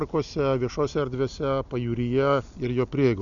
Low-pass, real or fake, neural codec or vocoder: 7.2 kHz; real; none